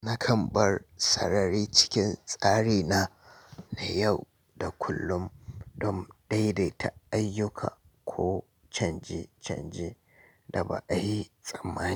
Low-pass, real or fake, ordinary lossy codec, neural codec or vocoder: none; real; none; none